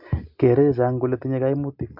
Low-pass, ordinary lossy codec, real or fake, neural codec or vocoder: 5.4 kHz; MP3, 48 kbps; real; none